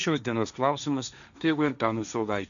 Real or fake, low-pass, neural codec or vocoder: fake; 7.2 kHz; codec, 16 kHz, 1.1 kbps, Voila-Tokenizer